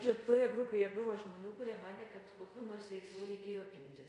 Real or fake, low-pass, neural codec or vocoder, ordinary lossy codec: fake; 10.8 kHz; codec, 24 kHz, 0.5 kbps, DualCodec; MP3, 48 kbps